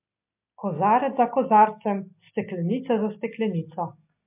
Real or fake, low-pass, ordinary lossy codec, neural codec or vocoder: real; 3.6 kHz; MP3, 32 kbps; none